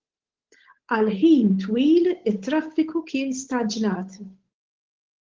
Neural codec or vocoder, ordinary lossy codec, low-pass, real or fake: codec, 16 kHz, 8 kbps, FunCodec, trained on Chinese and English, 25 frames a second; Opus, 16 kbps; 7.2 kHz; fake